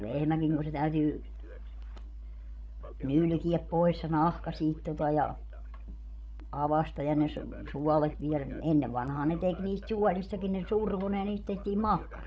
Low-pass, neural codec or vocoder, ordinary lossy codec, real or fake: none; codec, 16 kHz, 16 kbps, FreqCodec, larger model; none; fake